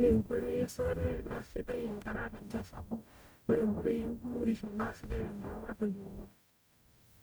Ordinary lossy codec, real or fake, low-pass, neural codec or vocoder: none; fake; none; codec, 44.1 kHz, 0.9 kbps, DAC